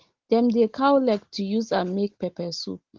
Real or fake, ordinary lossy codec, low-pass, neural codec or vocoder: real; Opus, 16 kbps; 7.2 kHz; none